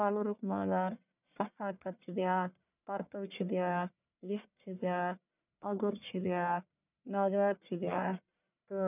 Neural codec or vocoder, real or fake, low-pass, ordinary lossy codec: codec, 44.1 kHz, 1.7 kbps, Pupu-Codec; fake; 3.6 kHz; none